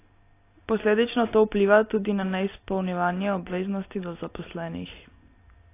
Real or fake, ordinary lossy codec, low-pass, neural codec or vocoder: real; AAC, 24 kbps; 3.6 kHz; none